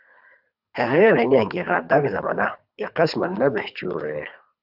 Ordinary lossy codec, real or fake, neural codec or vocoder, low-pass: AAC, 48 kbps; fake; codec, 24 kHz, 3 kbps, HILCodec; 5.4 kHz